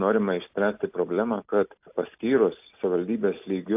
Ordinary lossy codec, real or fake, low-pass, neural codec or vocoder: AAC, 32 kbps; real; 3.6 kHz; none